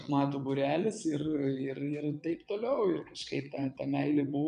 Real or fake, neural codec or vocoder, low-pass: fake; vocoder, 22.05 kHz, 80 mel bands, WaveNeXt; 9.9 kHz